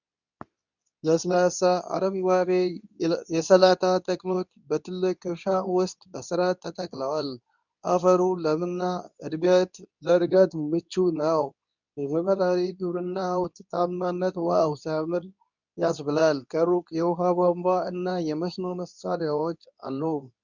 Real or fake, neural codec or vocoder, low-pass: fake; codec, 24 kHz, 0.9 kbps, WavTokenizer, medium speech release version 2; 7.2 kHz